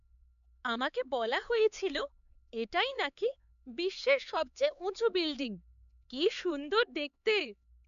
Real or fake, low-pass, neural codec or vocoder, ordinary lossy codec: fake; 7.2 kHz; codec, 16 kHz, 4 kbps, X-Codec, HuBERT features, trained on LibriSpeech; none